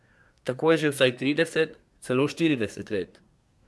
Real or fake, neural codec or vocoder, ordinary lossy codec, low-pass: fake; codec, 24 kHz, 1 kbps, SNAC; none; none